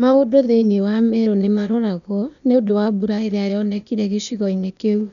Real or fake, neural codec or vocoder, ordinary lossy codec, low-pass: fake; codec, 16 kHz, 0.8 kbps, ZipCodec; none; 7.2 kHz